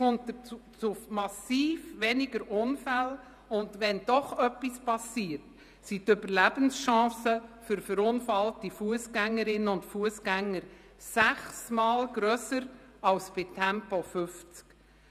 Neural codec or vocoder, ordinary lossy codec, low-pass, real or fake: vocoder, 48 kHz, 128 mel bands, Vocos; none; 14.4 kHz; fake